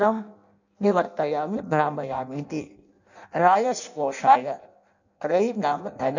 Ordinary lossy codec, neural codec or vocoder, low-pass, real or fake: none; codec, 16 kHz in and 24 kHz out, 0.6 kbps, FireRedTTS-2 codec; 7.2 kHz; fake